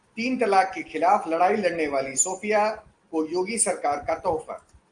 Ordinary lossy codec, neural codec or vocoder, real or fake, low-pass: Opus, 24 kbps; none; real; 9.9 kHz